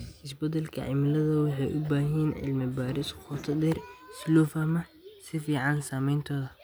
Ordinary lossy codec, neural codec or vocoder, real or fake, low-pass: none; none; real; none